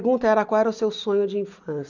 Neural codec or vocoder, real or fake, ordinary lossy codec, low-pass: none; real; none; 7.2 kHz